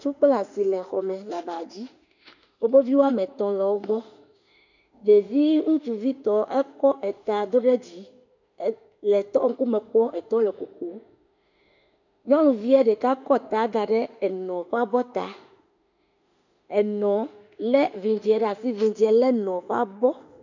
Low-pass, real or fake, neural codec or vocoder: 7.2 kHz; fake; autoencoder, 48 kHz, 32 numbers a frame, DAC-VAE, trained on Japanese speech